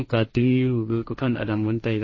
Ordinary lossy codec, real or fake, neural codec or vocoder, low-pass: MP3, 32 kbps; fake; codec, 16 kHz, 1.1 kbps, Voila-Tokenizer; 7.2 kHz